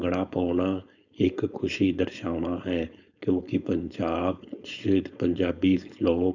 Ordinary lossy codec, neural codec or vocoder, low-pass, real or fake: none; codec, 16 kHz, 4.8 kbps, FACodec; 7.2 kHz; fake